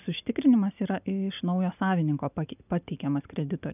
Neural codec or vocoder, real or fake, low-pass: none; real; 3.6 kHz